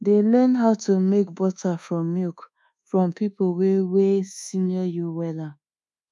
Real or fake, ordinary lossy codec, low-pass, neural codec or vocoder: fake; none; none; codec, 24 kHz, 1.2 kbps, DualCodec